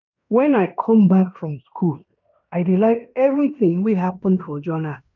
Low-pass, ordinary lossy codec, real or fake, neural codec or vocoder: 7.2 kHz; none; fake; codec, 16 kHz in and 24 kHz out, 0.9 kbps, LongCat-Audio-Codec, fine tuned four codebook decoder